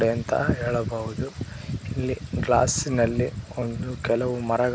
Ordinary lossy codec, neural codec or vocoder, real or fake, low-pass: none; none; real; none